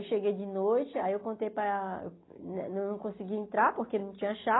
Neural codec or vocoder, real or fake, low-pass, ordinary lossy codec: none; real; 7.2 kHz; AAC, 16 kbps